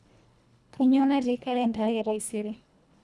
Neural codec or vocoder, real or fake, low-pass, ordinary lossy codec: codec, 24 kHz, 1.5 kbps, HILCodec; fake; none; none